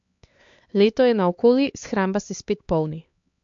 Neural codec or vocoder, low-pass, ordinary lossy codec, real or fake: codec, 16 kHz, 4 kbps, X-Codec, HuBERT features, trained on LibriSpeech; 7.2 kHz; MP3, 48 kbps; fake